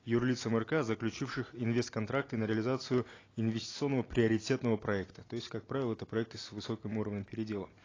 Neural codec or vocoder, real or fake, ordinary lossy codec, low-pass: none; real; AAC, 32 kbps; 7.2 kHz